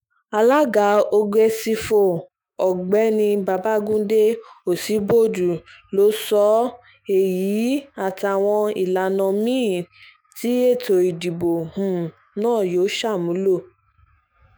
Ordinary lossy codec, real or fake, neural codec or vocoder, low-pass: none; fake; autoencoder, 48 kHz, 128 numbers a frame, DAC-VAE, trained on Japanese speech; none